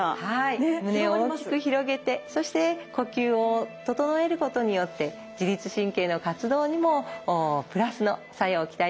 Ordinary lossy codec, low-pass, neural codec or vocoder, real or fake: none; none; none; real